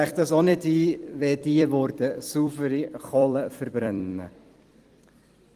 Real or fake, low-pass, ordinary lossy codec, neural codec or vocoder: fake; 14.4 kHz; Opus, 24 kbps; vocoder, 44.1 kHz, 128 mel bands every 256 samples, BigVGAN v2